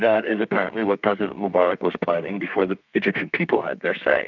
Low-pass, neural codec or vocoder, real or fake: 7.2 kHz; codec, 32 kHz, 1.9 kbps, SNAC; fake